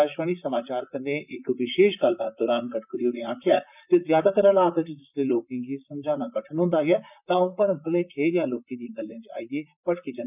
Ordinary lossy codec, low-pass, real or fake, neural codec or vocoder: none; 3.6 kHz; fake; vocoder, 44.1 kHz, 128 mel bands, Pupu-Vocoder